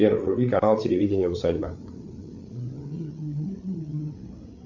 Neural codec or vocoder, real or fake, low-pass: codec, 16 kHz, 8 kbps, FreqCodec, larger model; fake; 7.2 kHz